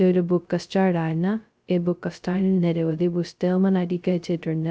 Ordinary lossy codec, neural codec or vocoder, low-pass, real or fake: none; codec, 16 kHz, 0.2 kbps, FocalCodec; none; fake